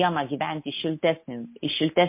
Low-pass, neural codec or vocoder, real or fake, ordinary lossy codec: 3.6 kHz; none; real; MP3, 24 kbps